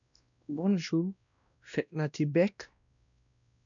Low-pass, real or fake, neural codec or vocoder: 7.2 kHz; fake; codec, 16 kHz, 1 kbps, X-Codec, WavLM features, trained on Multilingual LibriSpeech